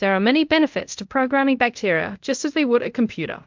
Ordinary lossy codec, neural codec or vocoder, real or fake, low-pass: MP3, 64 kbps; codec, 24 kHz, 0.5 kbps, DualCodec; fake; 7.2 kHz